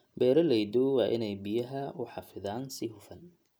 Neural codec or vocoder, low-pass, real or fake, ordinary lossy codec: none; none; real; none